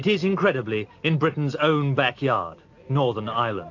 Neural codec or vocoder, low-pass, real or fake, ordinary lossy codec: none; 7.2 kHz; real; AAC, 48 kbps